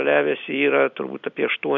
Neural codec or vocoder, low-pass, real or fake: none; 7.2 kHz; real